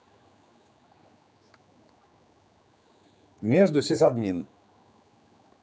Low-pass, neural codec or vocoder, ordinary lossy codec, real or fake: none; codec, 16 kHz, 4 kbps, X-Codec, HuBERT features, trained on general audio; none; fake